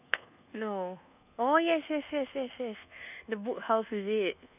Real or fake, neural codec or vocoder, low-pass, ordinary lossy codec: fake; codec, 24 kHz, 1.2 kbps, DualCodec; 3.6 kHz; none